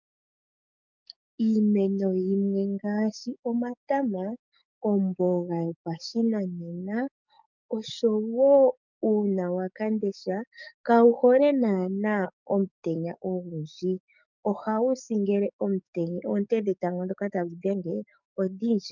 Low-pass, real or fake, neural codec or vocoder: 7.2 kHz; fake; codec, 44.1 kHz, 7.8 kbps, DAC